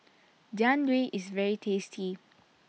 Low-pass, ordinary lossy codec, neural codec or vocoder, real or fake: none; none; none; real